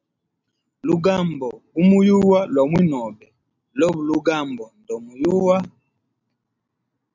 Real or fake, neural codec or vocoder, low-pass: real; none; 7.2 kHz